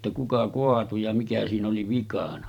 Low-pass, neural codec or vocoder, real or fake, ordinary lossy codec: 19.8 kHz; vocoder, 44.1 kHz, 128 mel bands every 512 samples, BigVGAN v2; fake; none